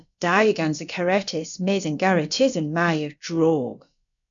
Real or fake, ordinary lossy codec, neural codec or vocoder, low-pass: fake; MP3, 96 kbps; codec, 16 kHz, about 1 kbps, DyCAST, with the encoder's durations; 7.2 kHz